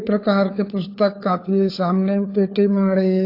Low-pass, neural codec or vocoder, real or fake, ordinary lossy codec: 5.4 kHz; codec, 16 kHz, 4 kbps, FunCodec, trained on LibriTTS, 50 frames a second; fake; AAC, 32 kbps